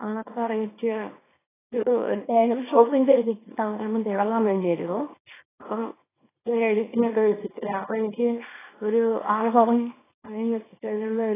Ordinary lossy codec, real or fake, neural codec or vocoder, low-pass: AAC, 16 kbps; fake; codec, 24 kHz, 0.9 kbps, WavTokenizer, small release; 3.6 kHz